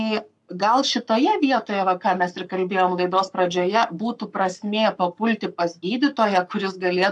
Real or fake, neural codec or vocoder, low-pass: fake; codec, 44.1 kHz, 7.8 kbps, Pupu-Codec; 10.8 kHz